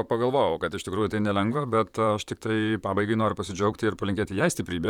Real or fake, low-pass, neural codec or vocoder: fake; 19.8 kHz; vocoder, 44.1 kHz, 128 mel bands, Pupu-Vocoder